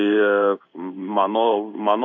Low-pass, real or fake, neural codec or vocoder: 7.2 kHz; fake; codec, 16 kHz in and 24 kHz out, 1 kbps, XY-Tokenizer